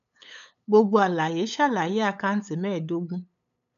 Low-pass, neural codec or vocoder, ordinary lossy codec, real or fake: 7.2 kHz; codec, 16 kHz, 8 kbps, FunCodec, trained on LibriTTS, 25 frames a second; none; fake